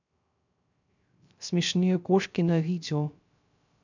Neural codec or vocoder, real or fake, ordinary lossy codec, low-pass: codec, 16 kHz, 0.3 kbps, FocalCodec; fake; none; 7.2 kHz